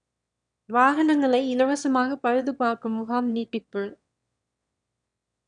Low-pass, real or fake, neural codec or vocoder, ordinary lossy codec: 9.9 kHz; fake; autoencoder, 22.05 kHz, a latent of 192 numbers a frame, VITS, trained on one speaker; none